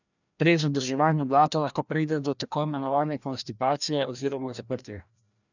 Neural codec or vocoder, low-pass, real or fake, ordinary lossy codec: codec, 16 kHz, 1 kbps, FreqCodec, larger model; 7.2 kHz; fake; none